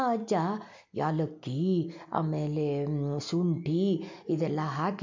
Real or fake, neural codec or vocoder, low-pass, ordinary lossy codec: real; none; 7.2 kHz; MP3, 64 kbps